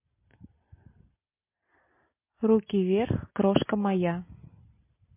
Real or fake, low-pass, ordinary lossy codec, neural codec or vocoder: real; 3.6 kHz; MP3, 24 kbps; none